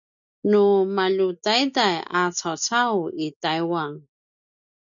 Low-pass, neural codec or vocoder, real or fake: 7.2 kHz; none; real